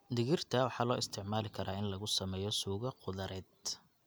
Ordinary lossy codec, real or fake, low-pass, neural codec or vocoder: none; real; none; none